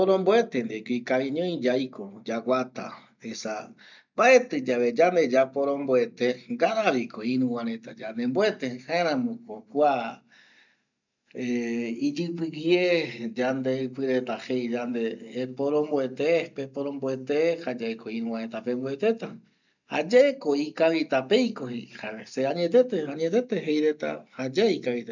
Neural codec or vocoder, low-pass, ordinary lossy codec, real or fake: none; 7.2 kHz; none; real